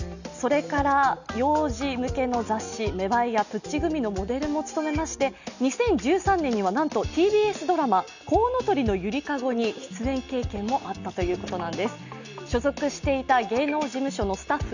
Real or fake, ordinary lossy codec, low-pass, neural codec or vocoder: real; none; 7.2 kHz; none